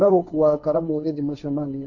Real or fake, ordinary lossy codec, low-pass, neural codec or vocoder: fake; none; 7.2 kHz; codec, 16 kHz, 1.1 kbps, Voila-Tokenizer